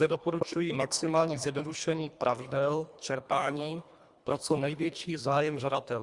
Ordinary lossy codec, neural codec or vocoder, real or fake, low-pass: Opus, 64 kbps; codec, 24 kHz, 1.5 kbps, HILCodec; fake; 10.8 kHz